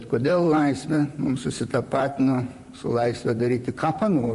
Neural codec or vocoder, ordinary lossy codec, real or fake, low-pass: vocoder, 44.1 kHz, 128 mel bands, Pupu-Vocoder; MP3, 48 kbps; fake; 14.4 kHz